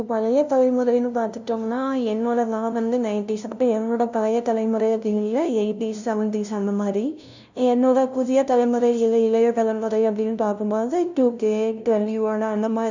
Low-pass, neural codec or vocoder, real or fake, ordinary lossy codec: 7.2 kHz; codec, 16 kHz, 0.5 kbps, FunCodec, trained on LibriTTS, 25 frames a second; fake; none